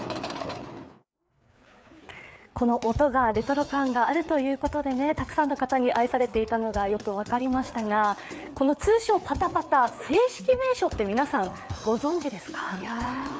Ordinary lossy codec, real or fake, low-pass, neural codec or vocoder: none; fake; none; codec, 16 kHz, 4 kbps, FreqCodec, larger model